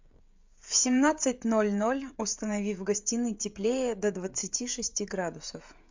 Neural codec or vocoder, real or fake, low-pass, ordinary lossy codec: codec, 16 kHz, 16 kbps, FreqCodec, smaller model; fake; 7.2 kHz; MP3, 64 kbps